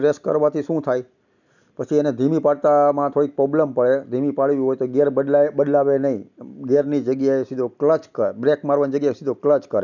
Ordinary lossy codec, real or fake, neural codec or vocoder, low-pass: none; real; none; 7.2 kHz